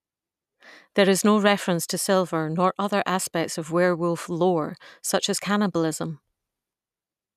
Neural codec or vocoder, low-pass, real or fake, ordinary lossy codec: none; 14.4 kHz; real; none